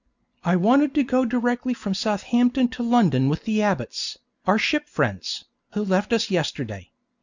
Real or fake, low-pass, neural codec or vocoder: real; 7.2 kHz; none